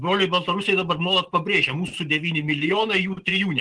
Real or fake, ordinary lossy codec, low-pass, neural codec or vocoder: real; Opus, 16 kbps; 9.9 kHz; none